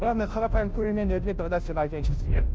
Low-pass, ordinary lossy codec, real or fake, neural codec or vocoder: none; none; fake; codec, 16 kHz, 0.5 kbps, FunCodec, trained on Chinese and English, 25 frames a second